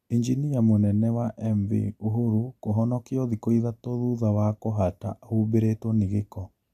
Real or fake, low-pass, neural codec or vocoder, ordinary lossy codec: real; 14.4 kHz; none; MP3, 64 kbps